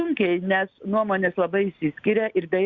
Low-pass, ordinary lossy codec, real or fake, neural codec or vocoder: 7.2 kHz; Opus, 64 kbps; real; none